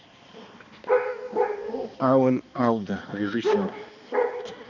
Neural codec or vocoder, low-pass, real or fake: codec, 16 kHz, 2 kbps, X-Codec, HuBERT features, trained on balanced general audio; 7.2 kHz; fake